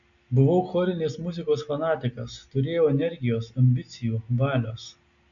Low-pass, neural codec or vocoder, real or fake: 7.2 kHz; none; real